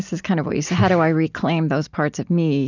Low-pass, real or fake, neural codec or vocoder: 7.2 kHz; real; none